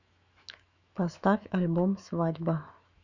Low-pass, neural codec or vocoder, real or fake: 7.2 kHz; autoencoder, 48 kHz, 128 numbers a frame, DAC-VAE, trained on Japanese speech; fake